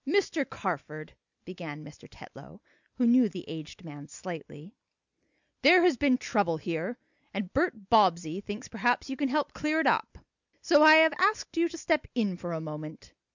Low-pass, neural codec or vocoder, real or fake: 7.2 kHz; none; real